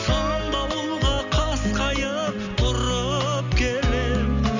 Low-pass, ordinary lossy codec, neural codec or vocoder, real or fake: 7.2 kHz; none; none; real